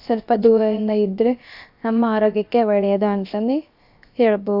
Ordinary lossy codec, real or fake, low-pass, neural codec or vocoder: AAC, 48 kbps; fake; 5.4 kHz; codec, 16 kHz, about 1 kbps, DyCAST, with the encoder's durations